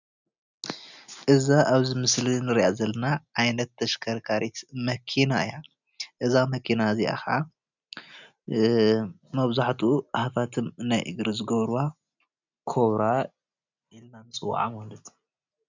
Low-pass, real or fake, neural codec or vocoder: 7.2 kHz; real; none